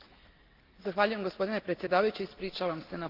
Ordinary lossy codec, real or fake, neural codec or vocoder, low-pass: Opus, 24 kbps; real; none; 5.4 kHz